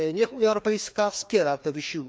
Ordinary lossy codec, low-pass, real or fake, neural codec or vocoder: none; none; fake; codec, 16 kHz, 2 kbps, FreqCodec, larger model